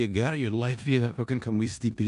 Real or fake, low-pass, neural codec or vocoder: fake; 10.8 kHz; codec, 16 kHz in and 24 kHz out, 0.4 kbps, LongCat-Audio-Codec, four codebook decoder